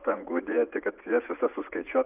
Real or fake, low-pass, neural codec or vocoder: fake; 3.6 kHz; vocoder, 44.1 kHz, 80 mel bands, Vocos